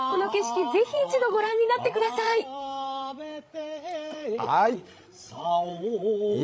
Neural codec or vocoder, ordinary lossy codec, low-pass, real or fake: codec, 16 kHz, 16 kbps, FreqCodec, larger model; none; none; fake